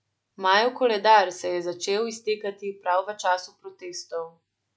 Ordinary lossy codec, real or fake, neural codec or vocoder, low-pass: none; real; none; none